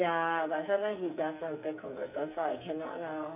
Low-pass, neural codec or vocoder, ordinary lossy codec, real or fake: 3.6 kHz; codec, 44.1 kHz, 2.6 kbps, SNAC; none; fake